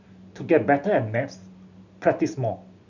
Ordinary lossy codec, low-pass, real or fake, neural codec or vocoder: none; 7.2 kHz; real; none